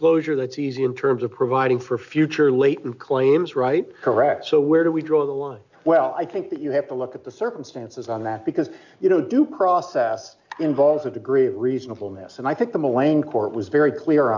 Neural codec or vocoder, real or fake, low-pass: none; real; 7.2 kHz